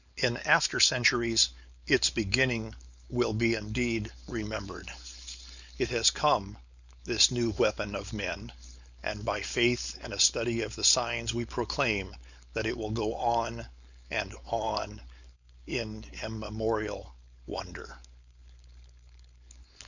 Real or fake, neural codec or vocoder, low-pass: fake; codec, 16 kHz, 4.8 kbps, FACodec; 7.2 kHz